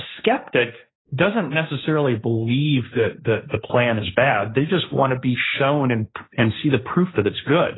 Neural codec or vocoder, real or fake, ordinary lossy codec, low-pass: codec, 16 kHz, 1.1 kbps, Voila-Tokenizer; fake; AAC, 16 kbps; 7.2 kHz